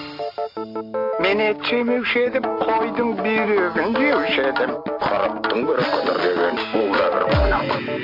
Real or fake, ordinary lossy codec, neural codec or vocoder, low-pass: real; MP3, 48 kbps; none; 5.4 kHz